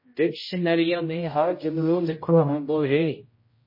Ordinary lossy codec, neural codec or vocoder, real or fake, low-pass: MP3, 24 kbps; codec, 16 kHz, 0.5 kbps, X-Codec, HuBERT features, trained on general audio; fake; 5.4 kHz